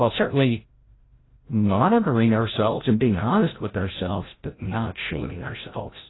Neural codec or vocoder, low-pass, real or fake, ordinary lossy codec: codec, 16 kHz, 0.5 kbps, FreqCodec, larger model; 7.2 kHz; fake; AAC, 16 kbps